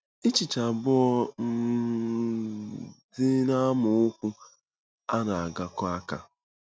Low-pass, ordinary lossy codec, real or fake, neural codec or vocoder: none; none; real; none